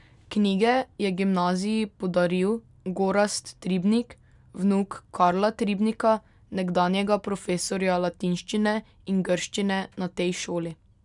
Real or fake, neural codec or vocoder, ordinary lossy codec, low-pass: real; none; none; 10.8 kHz